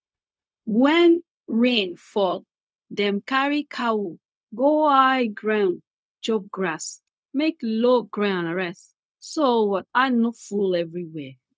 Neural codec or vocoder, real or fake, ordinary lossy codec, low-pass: codec, 16 kHz, 0.4 kbps, LongCat-Audio-Codec; fake; none; none